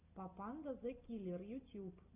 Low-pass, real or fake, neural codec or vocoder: 3.6 kHz; real; none